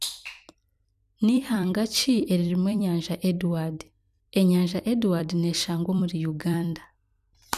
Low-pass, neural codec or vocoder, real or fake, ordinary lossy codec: 14.4 kHz; vocoder, 44.1 kHz, 128 mel bands every 256 samples, BigVGAN v2; fake; none